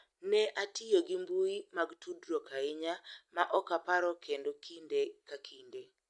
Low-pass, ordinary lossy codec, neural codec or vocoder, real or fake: 10.8 kHz; none; none; real